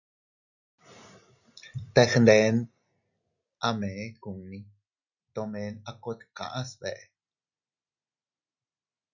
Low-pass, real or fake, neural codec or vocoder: 7.2 kHz; real; none